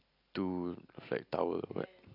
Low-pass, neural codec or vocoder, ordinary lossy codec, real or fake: 5.4 kHz; none; none; real